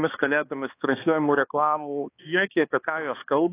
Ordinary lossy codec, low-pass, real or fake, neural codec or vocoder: AAC, 24 kbps; 3.6 kHz; fake; codec, 16 kHz, 2 kbps, X-Codec, HuBERT features, trained on balanced general audio